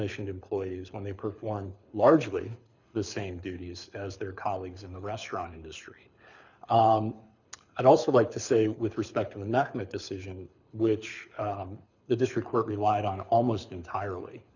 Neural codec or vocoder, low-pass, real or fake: codec, 24 kHz, 6 kbps, HILCodec; 7.2 kHz; fake